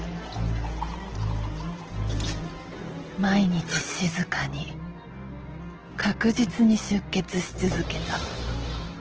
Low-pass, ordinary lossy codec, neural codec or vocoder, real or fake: 7.2 kHz; Opus, 16 kbps; none; real